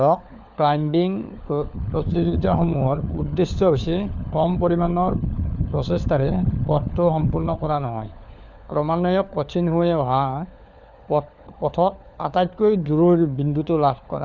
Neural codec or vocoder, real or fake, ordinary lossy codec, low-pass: codec, 16 kHz, 4 kbps, FunCodec, trained on LibriTTS, 50 frames a second; fake; none; 7.2 kHz